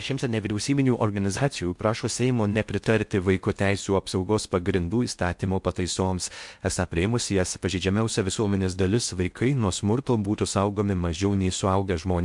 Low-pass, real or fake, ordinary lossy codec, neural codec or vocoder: 10.8 kHz; fake; MP3, 64 kbps; codec, 16 kHz in and 24 kHz out, 0.6 kbps, FocalCodec, streaming, 4096 codes